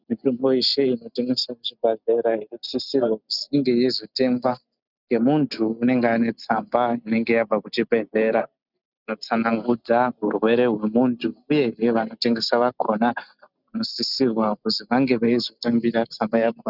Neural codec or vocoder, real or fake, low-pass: none; real; 5.4 kHz